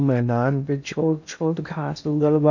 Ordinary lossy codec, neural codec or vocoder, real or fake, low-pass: none; codec, 16 kHz in and 24 kHz out, 0.6 kbps, FocalCodec, streaming, 2048 codes; fake; 7.2 kHz